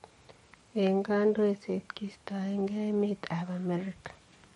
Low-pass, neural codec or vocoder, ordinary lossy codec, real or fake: 19.8 kHz; codec, 44.1 kHz, 7.8 kbps, DAC; MP3, 48 kbps; fake